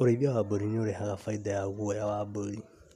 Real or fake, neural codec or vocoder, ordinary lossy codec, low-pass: real; none; none; 14.4 kHz